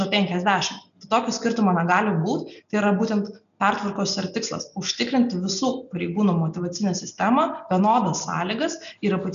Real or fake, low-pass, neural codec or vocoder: real; 7.2 kHz; none